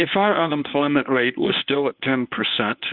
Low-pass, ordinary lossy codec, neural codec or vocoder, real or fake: 5.4 kHz; Opus, 64 kbps; codec, 24 kHz, 0.9 kbps, WavTokenizer, medium speech release version 1; fake